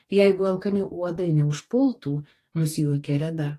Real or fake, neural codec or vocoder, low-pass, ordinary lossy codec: fake; codec, 44.1 kHz, 2.6 kbps, DAC; 14.4 kHz; AAC, 48 kbps